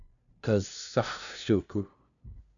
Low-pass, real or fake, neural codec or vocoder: 7.2 kHz; fake; codec, 16 kHz, 0.5 kbps, FunCodec, trained on LibriTTS, 25 frames a second